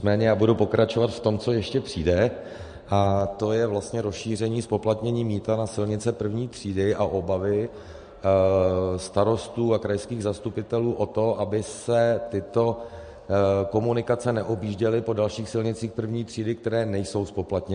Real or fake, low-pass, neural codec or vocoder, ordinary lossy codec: real; 9.9 kHz; none; MP3, 48 kbps